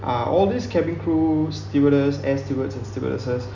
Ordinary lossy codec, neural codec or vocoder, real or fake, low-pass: none; none; real; 7.2 kHz